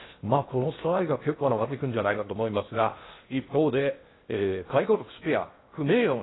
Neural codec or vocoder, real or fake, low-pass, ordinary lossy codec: codec, 16 kHz in and 24 kHz out, 0.6 kbps, FocalCodec, streaming, 2048 codes; fake; 7.2 kHz; AAC, 16 kbps